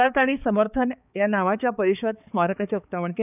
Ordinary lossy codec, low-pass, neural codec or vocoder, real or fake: none; 3.6 kHz; codec, 16 kHz, 4 kbps, X-Codec, HuBERT features, trained on balanced general audio; fake